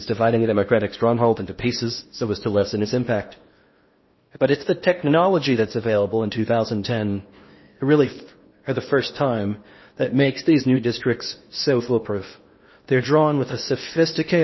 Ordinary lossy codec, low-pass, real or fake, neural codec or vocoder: MP3, 24 kbps; 7.2 kHz; fake; codec, 16 kHz in and 24 kHz out, 0.8 kbps, FocalCodec, streaming, 65536 codes